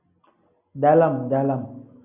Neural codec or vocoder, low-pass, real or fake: none; 3.6 kHz; real